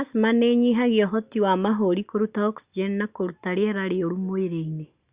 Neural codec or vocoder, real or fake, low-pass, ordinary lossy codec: none; real; 3.6 kHz; Opus, 64 kbps